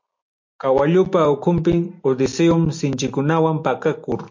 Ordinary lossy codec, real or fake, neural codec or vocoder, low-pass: MP3, 48 kbps; real; none; 7.2 kHz